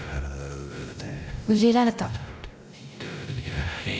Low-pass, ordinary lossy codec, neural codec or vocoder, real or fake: none; none; codec, 16 kHz, 0.5 kbps, X-Codec, WavLM features, trained on Multilingual LibriSpeech; fake